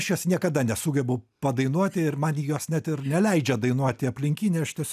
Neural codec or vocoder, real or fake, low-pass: none; real; 14.4 kHz